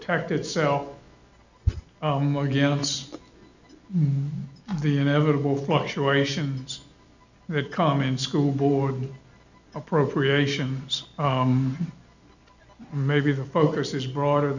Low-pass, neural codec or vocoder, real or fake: 7.2 kHz; none; real